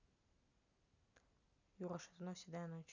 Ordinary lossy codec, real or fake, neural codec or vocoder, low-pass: none; real; none; 7.2 kHz